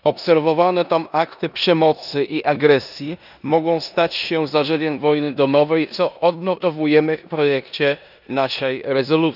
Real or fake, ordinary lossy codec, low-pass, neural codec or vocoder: fake; none; 5.4 kHz; codec, 16 kHz in and 24 kHz out, 0.9 kbps, LongCat-Audio-Codec, four codebook decoder